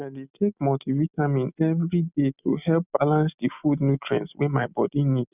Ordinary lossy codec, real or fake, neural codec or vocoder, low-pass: none; real; none; 3.6 kHz